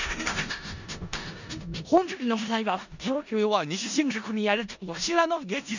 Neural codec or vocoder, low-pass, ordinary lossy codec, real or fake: codec, 16 kHz in and 24 kHz out, 0.4 kbps, LongCat-Audio-Codec, four codebook decoder; 7.2 kHz; none; fake